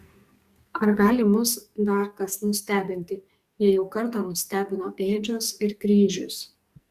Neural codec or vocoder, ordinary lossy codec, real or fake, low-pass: codec, 44.1 kHz, 2.6 kbps, SNAC; Opus, 64 kbps; fake; 14.4 kHz